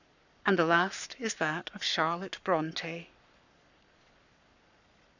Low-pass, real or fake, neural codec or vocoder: 7.2 kHz; fake; codec, 44.1 kHz, 7.8 kbps, Pupu-Codec